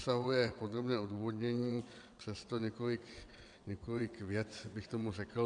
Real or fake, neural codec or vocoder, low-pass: fake; vocoder, 22.05 kHz, 80 mel bands, WaveNeXt; 9.9 kHz